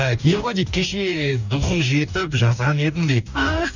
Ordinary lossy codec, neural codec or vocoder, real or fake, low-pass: none; codec, 44.1 kHz, 2.6 kbps, DAC; fake; 7.2 kHz